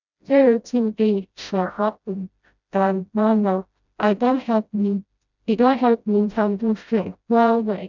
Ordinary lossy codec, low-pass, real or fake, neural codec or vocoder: none; 7.2 kHz; fake; codec, 16 kHz, 0.5 kbps, FreqCodec, smaller model